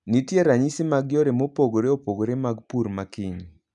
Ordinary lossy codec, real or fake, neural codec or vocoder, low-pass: none; real; none; 10.8 kHz